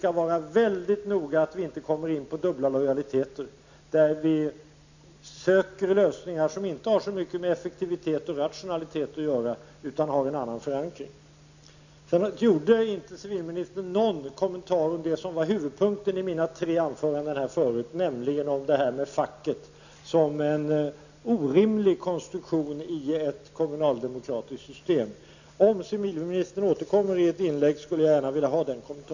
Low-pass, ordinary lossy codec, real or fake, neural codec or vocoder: 7.2 kHz; none; real; none